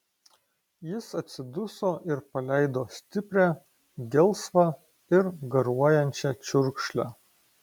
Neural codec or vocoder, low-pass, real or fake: none; 19.8 kHz; real